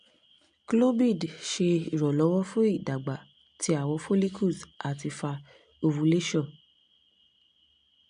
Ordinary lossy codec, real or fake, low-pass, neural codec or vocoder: MP3, 64 kbps; real; 9.9 kHz; none